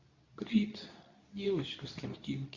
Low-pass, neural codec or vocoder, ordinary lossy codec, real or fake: 7.2 kHz; codec, 24 kHz, 0.9 kbps, WavTokenizer, medium speech release version 2; Opus, 64 kbps; fake